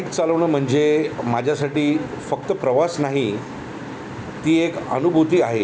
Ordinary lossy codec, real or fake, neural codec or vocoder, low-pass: none; real; none; none